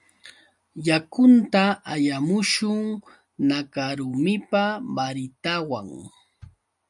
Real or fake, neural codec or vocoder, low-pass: real; none; 10.8 kHz